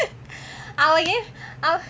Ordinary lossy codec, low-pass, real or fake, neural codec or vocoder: none; none; real; none